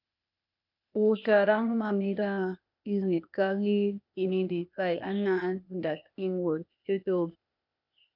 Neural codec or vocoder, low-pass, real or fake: codec, 16 kHz, 0.8 kbps, ZipCodec; 5.4 kHz; fake